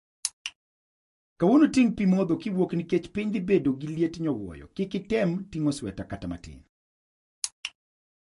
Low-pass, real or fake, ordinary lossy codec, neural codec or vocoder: 14.4 kHz; real; MP3, 48 kbps; none